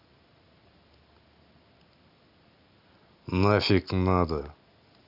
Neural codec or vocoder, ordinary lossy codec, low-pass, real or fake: none; none; 5.4 kHz; real